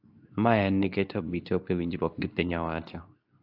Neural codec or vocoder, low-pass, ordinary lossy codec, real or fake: codec, 24 kHz, 0.9 kbps, WavTokenizer, medium speech release version 2; 5.4 kHz; AAC, 48 kbps; fake